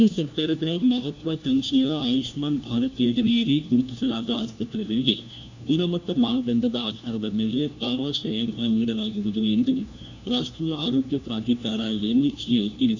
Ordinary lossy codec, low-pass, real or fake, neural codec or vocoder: none; 7.2 kHz; fake; codec, 16 kHz, 1 kbps, FunCodec, trained on LibriTTS, 50 frames a second